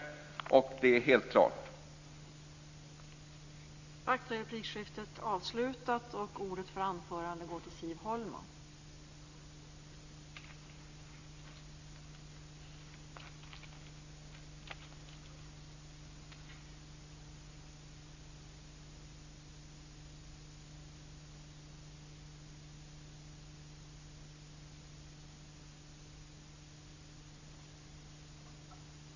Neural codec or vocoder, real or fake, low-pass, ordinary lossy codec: none; real; 7.2 kHz; Opus, 64 kbps